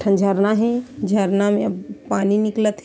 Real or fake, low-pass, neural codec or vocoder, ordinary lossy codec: real; none; none; none